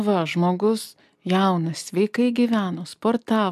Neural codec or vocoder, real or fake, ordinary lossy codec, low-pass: none; real; AAC, 96 kbps; 14.4 kHz